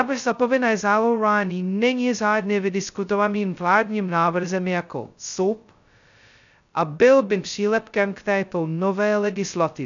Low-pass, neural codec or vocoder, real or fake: 7.2 kHz; codec, 16 kHz, 0.2 kbps, FocalCodec; fake